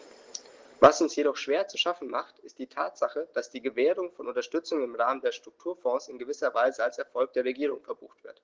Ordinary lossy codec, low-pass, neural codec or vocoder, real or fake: Opus, 16 kbps; 7.2 kHz; none; real